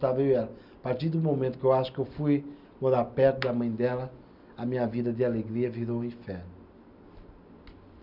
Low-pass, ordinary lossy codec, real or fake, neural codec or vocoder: 5.4 kHz; none; real; none